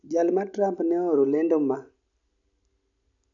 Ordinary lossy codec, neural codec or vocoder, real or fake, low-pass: none; none; real; 7.2 kHz